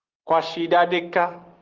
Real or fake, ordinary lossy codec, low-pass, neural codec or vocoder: real; Opus, 16 kbps; 7.2 kHz; none